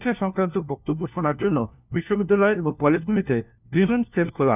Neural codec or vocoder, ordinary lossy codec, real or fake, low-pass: codec, 16 kHz, 1 kbps, FunCodec, trained on LibriTTS, 50 frames a second; none; fake; 3.6 kHz